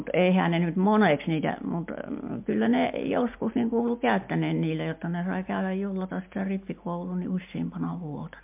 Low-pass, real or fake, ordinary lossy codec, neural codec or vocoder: 3.6 kHz; real; MP3, 32 kbps; none